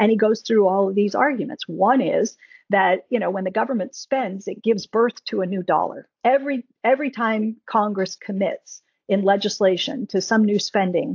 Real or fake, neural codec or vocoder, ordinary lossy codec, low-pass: real; none; AAC, 48 kbps; 7.2 kHz